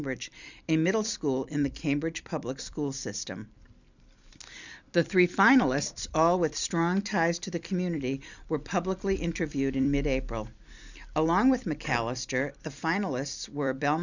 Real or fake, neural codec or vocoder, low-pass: fake; vocoder, 44.1 kHz, 128 mel bands every 256 samples, BigVGAN v2; 7.2 kHz